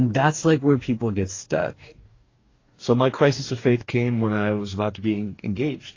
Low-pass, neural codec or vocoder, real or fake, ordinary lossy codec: 7.2 kHz; codec, 44.1 kHz, 2.6 kbps, DAC; fake; AAC, 32 kbps